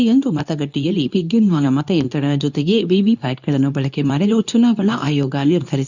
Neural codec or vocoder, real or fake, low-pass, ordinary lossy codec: codec, 24 kHz, 0.9 kbps, WavTokenizer, medium speech release version 2; fake; 7.2 kHz; none